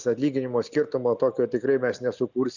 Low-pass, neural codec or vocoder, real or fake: 7.2 kHz; none; real